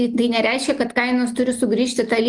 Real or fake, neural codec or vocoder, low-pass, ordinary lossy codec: real; none; 10.8 kHz; Opus, 32 kbps